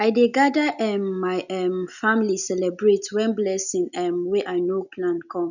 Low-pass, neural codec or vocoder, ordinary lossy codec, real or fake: 7.2 kHz; none; none; real